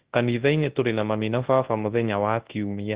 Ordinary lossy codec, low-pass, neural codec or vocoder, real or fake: Opus, 16 kbps; 3.6 kHz; codec, 24 kHz, 0.9 kbps, WavTokenizer, large speech release; fake